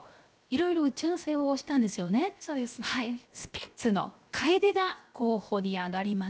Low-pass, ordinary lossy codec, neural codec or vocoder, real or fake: none; none; codec, 16 kHz, 0.7 kbps, FocalCodec; fake